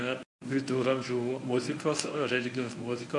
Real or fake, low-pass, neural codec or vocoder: fake; 10.8 kHz; codec, 24 kHz, 0.9 kbps, WavTokenizer, medium speech release version 1